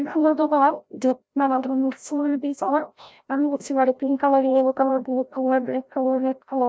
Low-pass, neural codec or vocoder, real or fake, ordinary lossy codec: none; codec, 16 kHz, 0.5 kbps, FreqCodec, larger model; fake; none